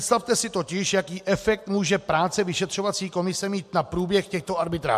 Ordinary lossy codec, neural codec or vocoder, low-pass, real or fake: MP3, 64 kbps; none; 14.4 kHz; real